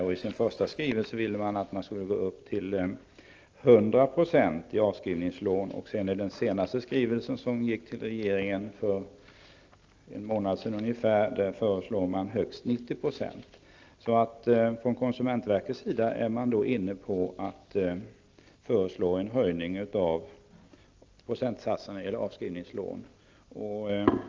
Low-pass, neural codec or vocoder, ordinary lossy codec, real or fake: 7.2 kHz; none; Opus, 24 kbps; real